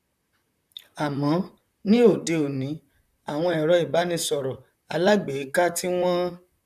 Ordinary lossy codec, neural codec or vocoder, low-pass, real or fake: none; vocoder, 44.1 kHz, 128 mel bands, Pupu-Vocoder; 14.4 kHz; fake